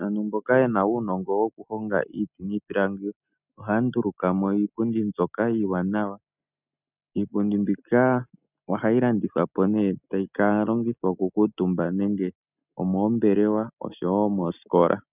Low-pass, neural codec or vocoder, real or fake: 3.6 kHz; none; real